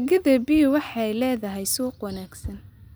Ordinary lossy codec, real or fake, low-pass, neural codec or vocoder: none; real; none; none